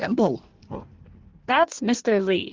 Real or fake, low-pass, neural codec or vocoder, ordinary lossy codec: fake; 7.2 kHz; codec, 16 kHz in and 24 kHz out, 1.1 kbps, FireRedTTS-2 codec; Opus, 16 kbps